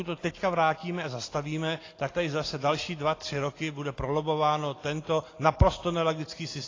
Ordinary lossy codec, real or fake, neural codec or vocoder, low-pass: AAC, 32 kbps; real; none; 7.2 kHz